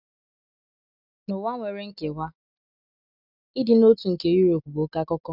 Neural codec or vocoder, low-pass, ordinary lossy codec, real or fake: none; 5.4 kHz; none; real